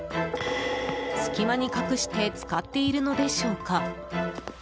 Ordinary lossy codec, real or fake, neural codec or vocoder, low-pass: none; real; none; none